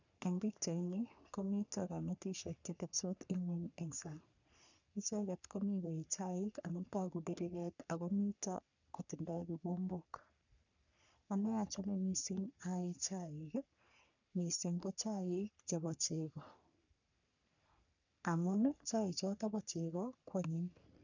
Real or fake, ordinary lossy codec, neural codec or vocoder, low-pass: fake; none; codec, 44.1 kHz, 3.4 kbps, Pupu-Codec; 7.2 kHz